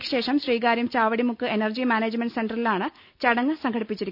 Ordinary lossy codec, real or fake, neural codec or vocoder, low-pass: none; real; none; 5.4 kHz